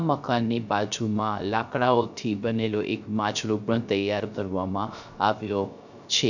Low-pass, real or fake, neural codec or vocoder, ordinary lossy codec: 7.2 kHz; fake; codec, 16 kHz, 0.3 kbps, FocalCodec; none